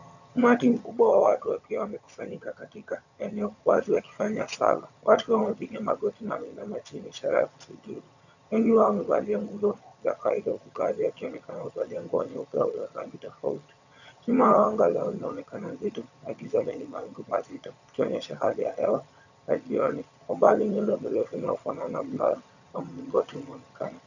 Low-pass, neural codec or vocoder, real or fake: 7.2 kHz; vocoder, 22.05 kHz, 80 mel bands, HiFi-GAN; fake